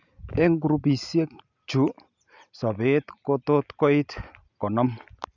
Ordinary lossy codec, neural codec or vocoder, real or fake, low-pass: none; none; real; 7.2 kHz